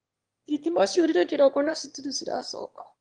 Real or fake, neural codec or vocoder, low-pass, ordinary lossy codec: fake; autoencoder, 22.05 kHz, a latent of 192 numbers a frame, VITS, trained on one speaker; 9.9 kHz; Opus, 24 kbps